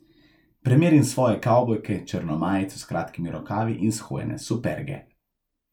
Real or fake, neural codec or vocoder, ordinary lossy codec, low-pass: real; none; none; 19.8 kHz